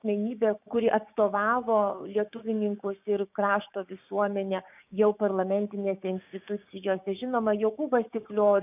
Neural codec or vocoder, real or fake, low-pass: codec, 16 kHz, 6 kbps, DAC; fake; 3.6 kHz